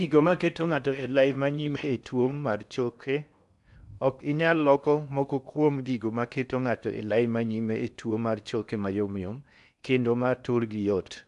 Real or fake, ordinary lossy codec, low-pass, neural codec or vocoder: fake; none; 10.8 kHz; codec, 16 kHz in and 24 kHz out, 0.8 kbps, FocalCodec, streaming, 65536 codes